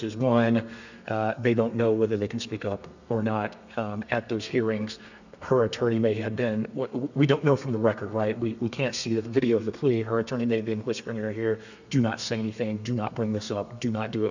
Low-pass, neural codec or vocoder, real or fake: 7.2 kHz; codec, 32 kHz, 1.9 kbps, SNAC; fake